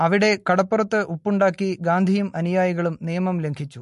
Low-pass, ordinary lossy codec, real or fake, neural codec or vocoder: 14.4 kHz; MP3, 48 kbps; real; none